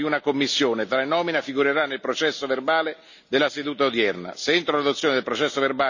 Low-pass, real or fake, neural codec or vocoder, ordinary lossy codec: 7.2 kHz; real; none; none